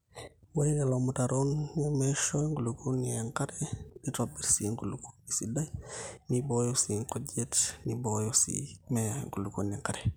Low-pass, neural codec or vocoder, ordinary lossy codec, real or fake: none; none; none; real